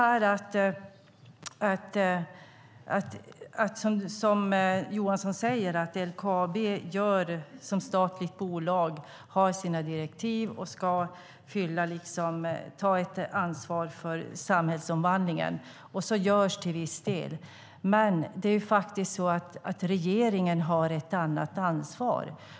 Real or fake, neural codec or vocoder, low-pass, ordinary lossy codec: real; none; none; none